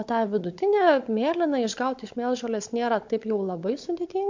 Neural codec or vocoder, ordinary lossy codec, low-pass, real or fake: codec, 16 kHz, 16 kbps, FunCodec, trained on Chinese and English, 50 frames a second; MP3, 48 kbps; 7.2 kHz; fake